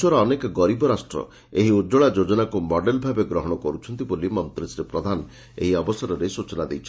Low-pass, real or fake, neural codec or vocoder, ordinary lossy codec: none; real; none; none